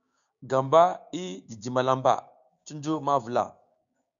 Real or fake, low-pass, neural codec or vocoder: fake; 7.2 kHz; codec, 16 kHz, 6 kbps, DAC